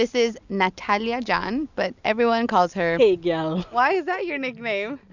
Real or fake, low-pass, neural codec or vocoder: real; 7.2 kHz; none